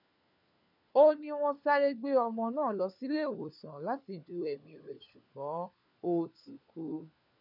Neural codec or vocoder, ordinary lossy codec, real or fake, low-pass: codec, 16 kHz, 4 kbps, FunCodec, trained on LibriTTS, 50 frames a second; none; fake; 5.4 kHz